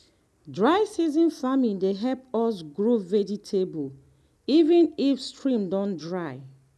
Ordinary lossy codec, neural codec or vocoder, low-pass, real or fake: none; none; none; real